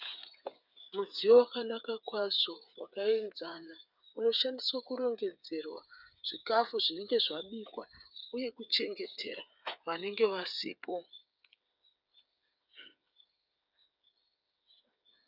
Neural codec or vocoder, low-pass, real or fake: codec, 16 kHz, 8 kbps, FreqCodec, smaller model; 5.4 kHz; fake